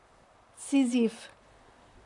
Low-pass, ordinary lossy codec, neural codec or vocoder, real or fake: 10.8 kHz; none; vocoder, 44.1 kHz, 128 mel bands, Pupu-Vocoder; fake